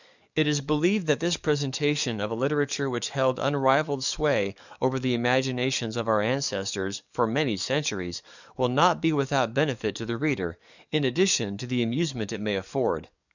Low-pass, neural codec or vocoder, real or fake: 7.2 kHz; codec, 44.1 kHz, 7.8 kbps, DAC; fake